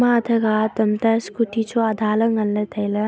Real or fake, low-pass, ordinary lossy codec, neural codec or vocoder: real; none; none; none